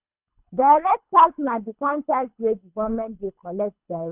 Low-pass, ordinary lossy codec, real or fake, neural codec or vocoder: 3.6 kHz; none; fake; codec, 24 kHz, 3 kbps, HILCodec